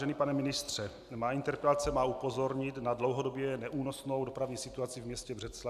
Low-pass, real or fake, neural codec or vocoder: 14.4 kHz; real; none